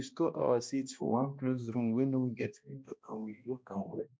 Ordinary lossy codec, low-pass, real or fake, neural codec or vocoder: none; none; fake; codec, 16 kHz, 1 kbps, X-Codec, HuBERT features, trained on balanced general audio